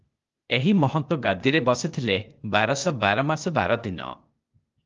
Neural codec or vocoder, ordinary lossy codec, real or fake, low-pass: codec, 16 kHz, 0.8 kbps, ZipCodec; Opus, 32 kbps; fake; 7.2 kHz